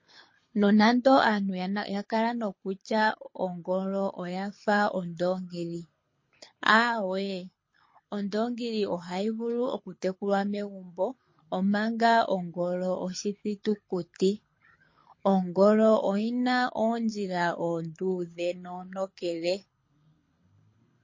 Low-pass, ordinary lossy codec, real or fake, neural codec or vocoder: 7.2 kHz; MP3, 32 kbps; fake; codec, 24 kHz, 6 kbps, HILCodec